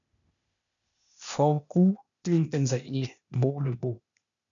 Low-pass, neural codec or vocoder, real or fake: 7.2 kHz; codec, 16 kHz, 0.8 kbps, ZipCodec; fake